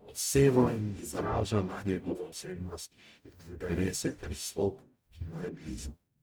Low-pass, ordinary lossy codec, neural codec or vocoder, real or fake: none; none; codec, 44.1 kHz, 0.9 kbps, DAC; fake